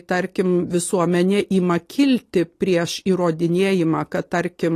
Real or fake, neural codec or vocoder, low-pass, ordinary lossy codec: real; none; 14.4 kHz; AAC, 48 kbps